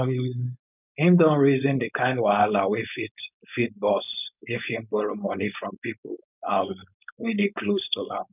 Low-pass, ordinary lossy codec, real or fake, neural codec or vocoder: 3.6 kHz; none; fake; codec, 16 kHz, 4.8 kbps, FACodec